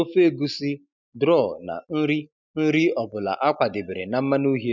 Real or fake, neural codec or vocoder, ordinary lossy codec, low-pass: real; none; none; 7.2 kHz